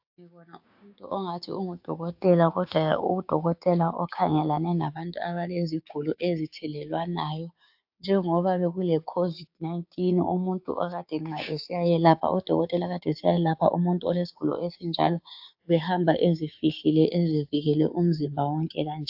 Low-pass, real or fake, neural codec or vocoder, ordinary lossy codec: 5.4 kHz; fake; codec, 16 kHz, 6 kbps, DAC; AAC, 48 kbps